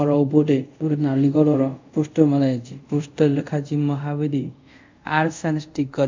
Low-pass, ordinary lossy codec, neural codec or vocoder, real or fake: 7.2 kHz; MP3, 64 kbps; codec, 24 kHz, 0.5 kbps, DualCodec; fake